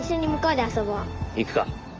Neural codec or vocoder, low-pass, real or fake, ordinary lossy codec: none; 7.2 kHz; real; Opus, 24 kbps